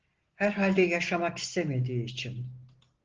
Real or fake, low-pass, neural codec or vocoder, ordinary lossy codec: real; 7.2 kHz; none; Opus, 16 kbps